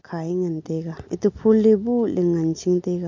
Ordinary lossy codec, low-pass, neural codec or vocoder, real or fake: MP3, 48 kbps; 7.2 kHz; none; real